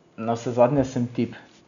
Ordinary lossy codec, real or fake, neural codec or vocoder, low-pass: none; real; none; 7.2 kHz